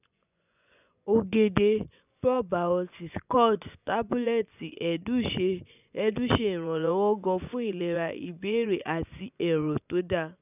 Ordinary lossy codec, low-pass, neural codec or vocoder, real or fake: none; 3.6 kHz; vocoder, 24 kHz, 100 mel bands, Vocos; fake